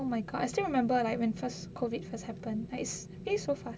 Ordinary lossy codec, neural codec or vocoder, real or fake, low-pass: none; none; real; none